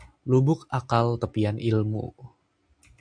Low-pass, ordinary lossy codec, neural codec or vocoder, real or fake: 9.9 kHz; AAC, 64 kbps; none; real